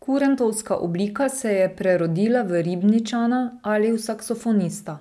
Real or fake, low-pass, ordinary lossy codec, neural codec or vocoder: real; none; none; none